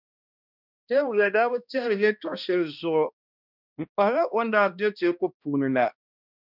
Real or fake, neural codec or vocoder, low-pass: fake; codec, 16 kHz, 1 kbps, X-Codec, HuBERT features, trained on balanced general audio; 5.4 kHz